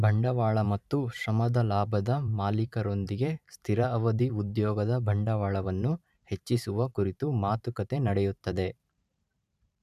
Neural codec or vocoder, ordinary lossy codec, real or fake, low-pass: vocoder, 44.1 kHz, 128 mel bands every 512 samples, BigVGAN v2; none; fake; 14.4 kHz